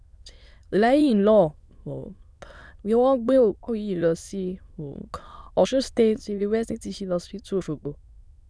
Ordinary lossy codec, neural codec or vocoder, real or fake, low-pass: none; autoencoder, 22.05 kHz, a latent of 192 numbers a frame, VITS, trained on many speakers; fake; none